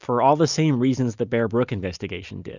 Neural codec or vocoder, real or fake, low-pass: none; real; 7.2 kHz